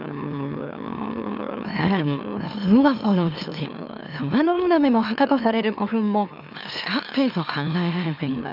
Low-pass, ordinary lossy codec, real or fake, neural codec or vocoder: 5.4 kHz; none; fake; autoencoder, 44.1 kHz, a latent of 192 numbers a frame, MeloTTS